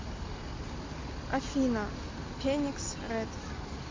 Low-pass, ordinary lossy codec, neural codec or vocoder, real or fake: 7.2 kHz; MP3, 48 kbps; none; real